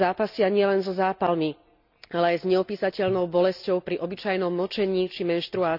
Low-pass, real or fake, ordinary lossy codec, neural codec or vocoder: 5.4 kHz; real; none; none